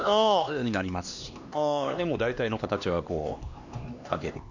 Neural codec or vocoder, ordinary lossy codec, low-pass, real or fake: codec, 16 kHz, 2 kbps, X-Codec, HuBERT features, trained on LibriSpeech; none; 7.2 kHz; fake